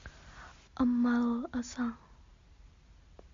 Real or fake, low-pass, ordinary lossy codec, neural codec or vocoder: real; 7.2 kHz; MP3, 48 kbps; none